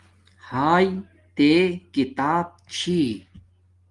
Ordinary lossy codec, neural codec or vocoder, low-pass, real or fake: Opus, 24 kbps; none; 10.8 kHz; real